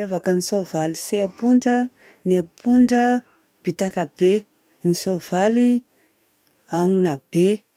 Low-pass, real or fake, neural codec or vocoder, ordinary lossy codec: 19.8 kHz; fake; codec, 44.1 kHz, 2.6 kbps, DAC; none